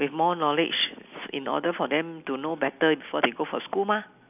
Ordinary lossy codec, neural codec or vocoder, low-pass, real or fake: none; none; 3.6 kHz; real